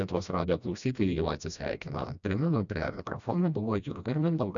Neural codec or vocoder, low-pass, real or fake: codec, 16 kHz, 1 kbps, FreqCodec, smaller model; 7.2 kHz; fake